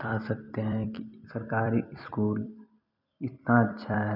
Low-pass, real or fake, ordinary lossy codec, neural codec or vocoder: 5.4 kHz; fake; none; vocoder, 44.1 kHz, 128 mel bands every 256 samples, BigVGAN v2